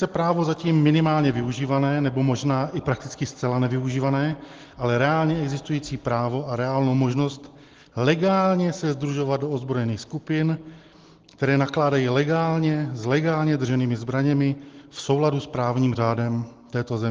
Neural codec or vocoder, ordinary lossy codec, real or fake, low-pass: none; Opus, 16 kbps; real; 7.2 kHz